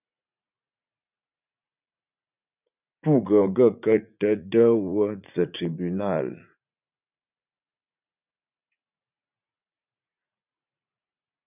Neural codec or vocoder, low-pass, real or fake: vocoder, 44.1 kHz, 80 mel bands, Vocos; 3.6 kHz; fake